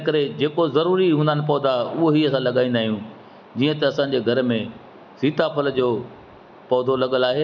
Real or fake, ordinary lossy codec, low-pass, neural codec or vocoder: real; none; 7.2 kHz; none